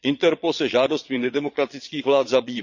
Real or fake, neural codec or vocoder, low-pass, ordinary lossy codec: fake; vocoder, 22.05 kHz, 80 mel bands, Vocos; 7.2 kHz; Opus, 64 kbps